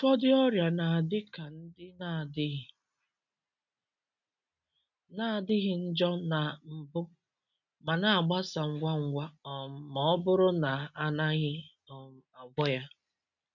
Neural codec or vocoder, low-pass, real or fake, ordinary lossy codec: none; 7.2 kHz; real; none